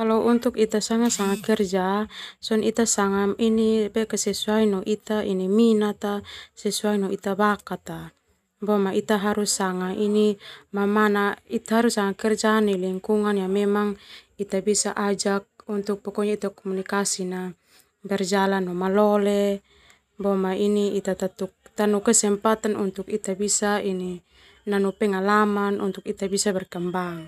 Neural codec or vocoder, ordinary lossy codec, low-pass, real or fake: none; none; 14.4 kHz; real